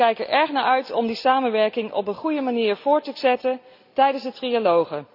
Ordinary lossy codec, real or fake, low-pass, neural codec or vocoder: none; real; 5.4 kHz; none